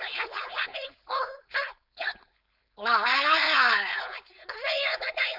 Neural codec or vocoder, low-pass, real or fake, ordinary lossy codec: codec, 16 kHz, 4.8 kbps, FACodec; 5.4 kHz; fake; none